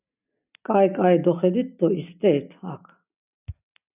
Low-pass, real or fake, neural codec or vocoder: 3.6 kHz; real; none